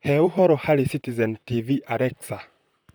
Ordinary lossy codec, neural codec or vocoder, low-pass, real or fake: none; vocoder, 44.1 kHz, 128 mel bands, Pupu-Vocoder; none; fake